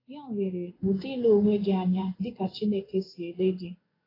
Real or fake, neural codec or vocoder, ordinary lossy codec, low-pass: fake; codec, 16 kHz in and 24 kHz out, 1 kbps, XY-Tokenizer; AAC, 24 kbps; 5.4 kHz